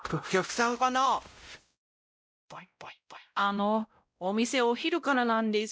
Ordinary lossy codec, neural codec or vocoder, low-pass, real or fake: none; codec, 16 kHz, 0.5 kbps, X-Codec, WavLM features, trained on Multilingual LibriSpeech; none; fake